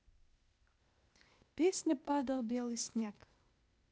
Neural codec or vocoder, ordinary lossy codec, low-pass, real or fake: codec, 16 kHz, 0.8 kbps, ZipCodec; none; none; fake